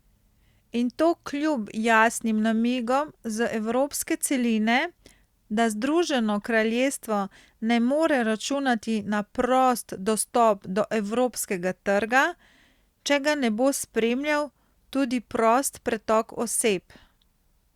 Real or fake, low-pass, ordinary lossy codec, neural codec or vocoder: real; 19.8 kHz; Opus, 64 kbps; none